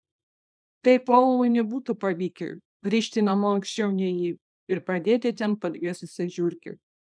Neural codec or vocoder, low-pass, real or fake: codec, 24 kHz, 0.9 kbps, WavTokenizer, small release; 9.9 kHz; fake